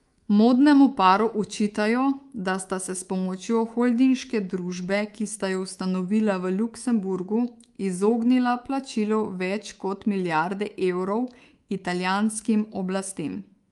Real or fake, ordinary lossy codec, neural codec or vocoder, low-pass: fake; Opus, 32 kbps; codec, 24 kHz, 3.1 kbps, DualCodec; 10.8 kHz